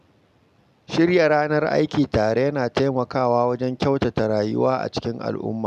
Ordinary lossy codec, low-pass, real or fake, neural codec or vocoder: none; 14.4 kHz; real; none